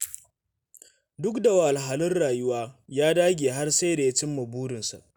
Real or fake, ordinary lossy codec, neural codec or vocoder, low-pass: real; none; none; none